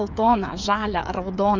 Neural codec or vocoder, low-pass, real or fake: codec, 16 kHz, 8 kbps, FreqCodec, smaller model; 7.2 kHz; fake